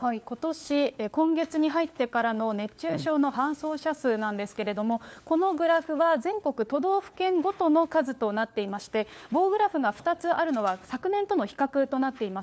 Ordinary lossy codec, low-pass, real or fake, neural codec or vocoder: none; none; fake; codec, 16 kHz, 4 kbps, FunCodec, trained on LibriTTS, 50 frames a second